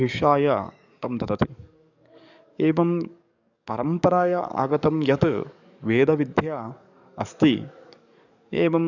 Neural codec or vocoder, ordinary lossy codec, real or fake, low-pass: codec, 44.1 kHz, 7.8 kbps, DAC; none; fake; 7.2 kHz